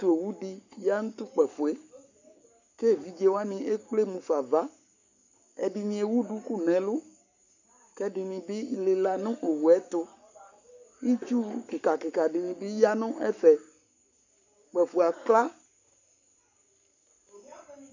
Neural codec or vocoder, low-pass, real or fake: codec, 44.1 kHz, 7.8 kbps, Pupu-Codec; 7.2 kHz; fake